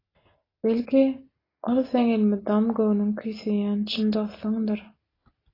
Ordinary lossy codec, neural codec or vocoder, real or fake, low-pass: AAC, 24 kbps; none; real; 5.4 kHz